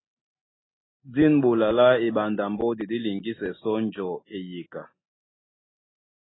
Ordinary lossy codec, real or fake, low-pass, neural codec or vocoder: AAC, 16 kbps; real; 7.2 kHz; none